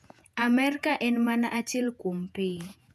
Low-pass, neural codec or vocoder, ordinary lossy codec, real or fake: 14.4 kHz; vocoder, 48 kHz, 128 mel bands, Vocos; none; fake